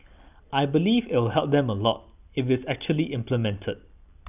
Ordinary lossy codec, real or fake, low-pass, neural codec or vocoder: none; real; 3.6 kHz; none